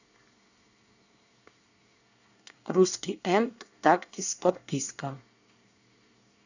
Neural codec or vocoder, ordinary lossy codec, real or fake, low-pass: codec, 24 kHz, 1 kbps, SNAC; none; fake; 7.2 kHz